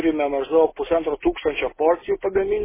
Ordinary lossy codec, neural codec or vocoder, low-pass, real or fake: MP3, 16 kbps; none; 3.6 kHz; real